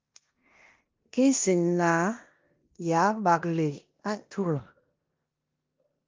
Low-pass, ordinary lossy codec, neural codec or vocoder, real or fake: 7.2 kHz; Opus, 32 kbps; codec, 16 kHz in and 24 kHz out, 0.9 kbps, LongCat-Audio-Codec, four codebook decoder; fake